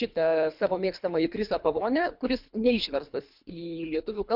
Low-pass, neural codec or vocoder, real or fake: 5.4 kHz; codec, 24 kHz, 3 kbps, HILCodec; fake